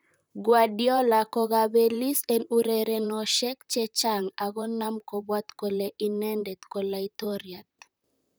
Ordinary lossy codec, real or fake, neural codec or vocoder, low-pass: none; fake; vocoder, 44.1 kHz, 128 mel bands, Pupu-Vocoder; none